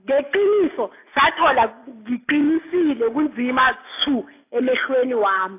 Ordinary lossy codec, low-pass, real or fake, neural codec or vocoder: AAC, 24 kbps; 3.6 kHz; real; none